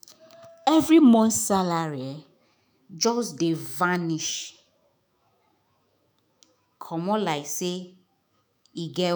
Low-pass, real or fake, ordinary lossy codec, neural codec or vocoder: none; fake; none; autoencoder, 48 kHz, 128 numbers a frame, DAC-VAE, trained on Japanese speech